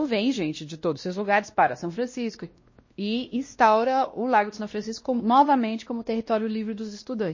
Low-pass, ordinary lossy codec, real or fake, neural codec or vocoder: 7.2 kHz; MP3, 32 kbps; fake; codec, 16 kHz, 1 kbps, X-Codec, WavLM features, trained on Multilingual LibriSpeech